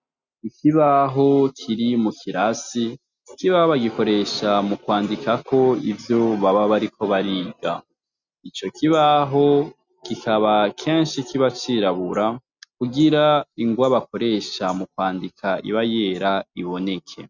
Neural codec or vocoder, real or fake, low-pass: none; real; 7.2 kHz